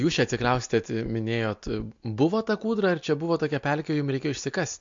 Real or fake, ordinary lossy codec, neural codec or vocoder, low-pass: real; MP3, 48 kbps; none; 7.2 kHz